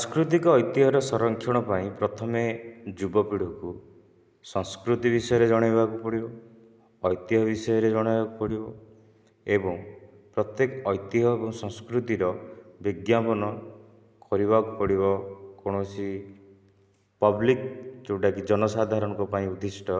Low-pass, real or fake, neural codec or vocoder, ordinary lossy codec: none; real; none; none